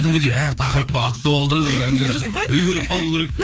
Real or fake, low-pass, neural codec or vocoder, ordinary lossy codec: fake; none; codec, 16 kHz, 2 kbps, FreqCodec, larger model; none